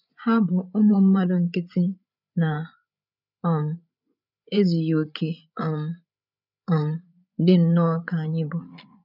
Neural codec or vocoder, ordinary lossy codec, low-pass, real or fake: codec, 16 kHz, 8 kbps, FreqCodec, larger model; none; 5.4 kHz; fake